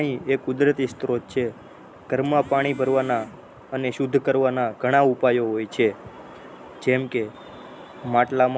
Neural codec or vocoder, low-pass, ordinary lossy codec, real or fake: none; none; none; real